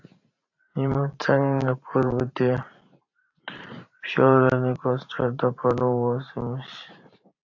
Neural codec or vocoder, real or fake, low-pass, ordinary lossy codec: vocoder, 44.1 kHz, 128 mel bands every 512 samples, BigVGAN v2; fake; 7.2 kHz; AAC, 48 kbps